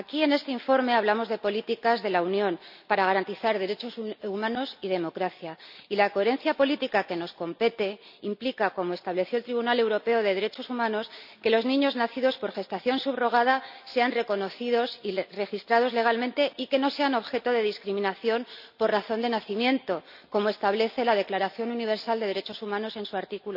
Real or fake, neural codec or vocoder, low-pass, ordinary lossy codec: real; none; 5.4 kHz; none